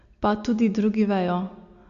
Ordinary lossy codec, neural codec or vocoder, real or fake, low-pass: Opus, 64 kbps; none; real; 7.2 kHz